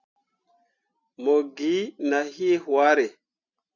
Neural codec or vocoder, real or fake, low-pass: none; real; 7.2 kHz